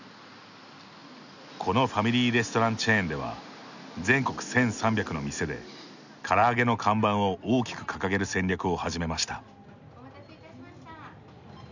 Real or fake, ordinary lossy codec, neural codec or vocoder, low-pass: real; none; none; 7.2 kHz